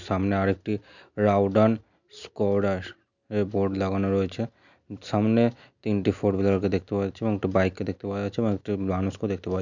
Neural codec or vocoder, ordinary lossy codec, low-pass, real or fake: none; none; 7.2 kHz; real